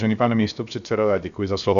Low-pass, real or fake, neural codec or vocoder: 7.2 kHz; fake; codec, 16 kHz, 0.7 kbps, FocalCodec